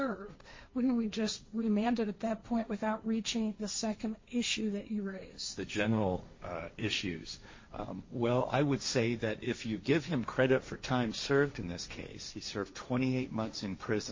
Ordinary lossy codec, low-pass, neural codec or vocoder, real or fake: MP3, 32 kbps; 7.2 kHz; codec, 16 kHz, 1.1 kbps, Voila-Tokenizer; fake